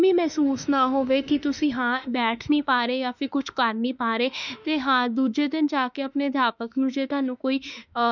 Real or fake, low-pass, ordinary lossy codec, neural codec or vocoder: fake; 7.2 kHz; none; autoencoder, 48 kHz, 32 numbers a frame, DAC-VAE, trained on Japanese speech